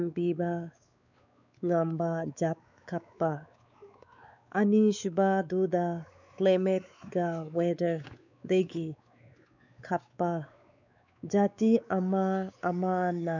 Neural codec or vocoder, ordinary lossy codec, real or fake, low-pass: codec, 16 kHz, 4 kbps, X-Codec, WavLM features, trained on Multilingual LibriSpeech; none; fake; 7.2 kHz